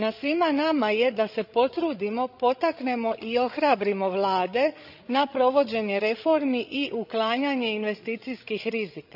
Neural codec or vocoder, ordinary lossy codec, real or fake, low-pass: codec, 16 kHz, 16 kbps, FreqCodec, larger model; none; fake; 5.4 kHz